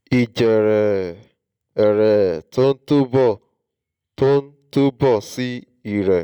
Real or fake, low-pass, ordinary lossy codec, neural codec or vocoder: real; 19.8 kHz; none; none